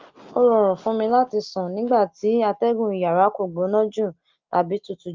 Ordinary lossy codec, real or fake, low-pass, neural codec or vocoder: Opus, 32 kbps; real; 7.2 kHz; none